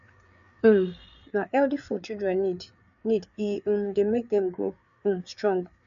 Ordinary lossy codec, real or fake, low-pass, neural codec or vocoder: none; fake; 7.2 kHz; codec, 16 kHz, 4 kbps, FreqCodec, larger model